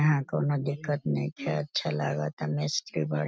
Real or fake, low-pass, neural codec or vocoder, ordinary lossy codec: real; none; none; none